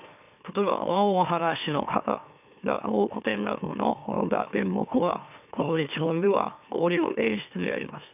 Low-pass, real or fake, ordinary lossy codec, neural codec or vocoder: 3.6 kHz; fake; none; autoencoder, 44.1 kHz, a latent of 192 numbers a frame, MeloTTS